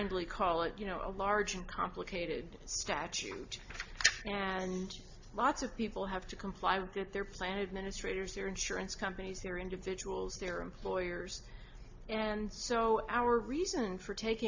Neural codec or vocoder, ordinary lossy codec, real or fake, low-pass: none; AAC, 48 kbps; real; 7.2 kHz